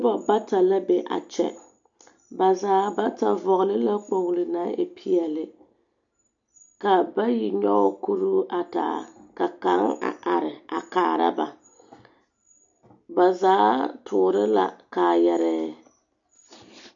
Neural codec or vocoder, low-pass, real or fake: none; 7.2 kHz; real